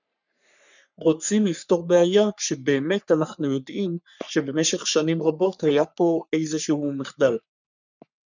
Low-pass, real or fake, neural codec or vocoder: 7.2 kHz; fake; codec, 44.1 kHz, 3.4 kbps, Pupu-Codec